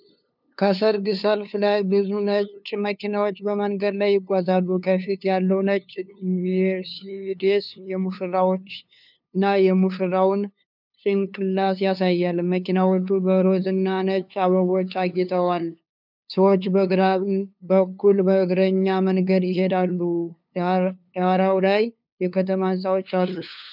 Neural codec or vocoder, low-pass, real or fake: codec, 16 kHz, 2 kbps, FunCodec, trained on LibriTTS, 25 frames a second; 5.4 kHz; fake